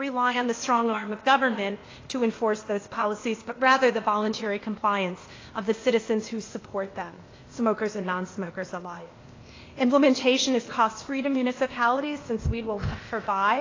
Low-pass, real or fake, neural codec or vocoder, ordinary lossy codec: 7.2 kHz; fake; codec, 16 kHz, 0.8 kbps, ZipCodec; AAC, 32 kbps